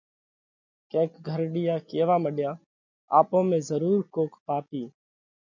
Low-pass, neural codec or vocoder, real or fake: 7.2 kHz; none; real